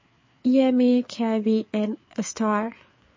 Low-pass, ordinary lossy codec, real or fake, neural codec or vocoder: 7.2 kHz; MP3, 32 kbps; fake; codec, 16 kHz, 4 kbps, FreqCodec, larger model